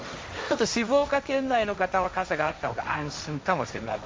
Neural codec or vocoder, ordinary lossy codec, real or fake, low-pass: codec, 16 kHz, 1.1 kbps, Voila-Tokenizer; none; fake; none